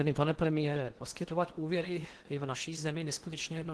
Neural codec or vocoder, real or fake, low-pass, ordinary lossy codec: codec, 16 kHz in and 24 kHz out, 0.8 kbps, FocalCodec, streaming, 65536 codes; fake; 10.8 kHz; Opus, 16 kbps